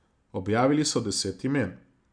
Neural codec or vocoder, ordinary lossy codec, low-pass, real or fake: none; Opus, 64 kbps; 9.9 kHz; real